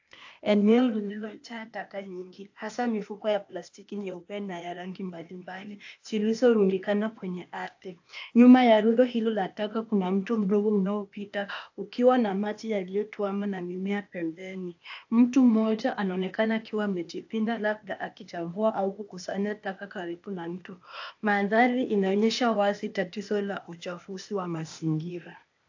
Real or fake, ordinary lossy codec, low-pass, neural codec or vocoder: fake; AAC, 48 kbps; 7.2 kHz; codec, 16 kHz, 0.8 kbps, ZipCodec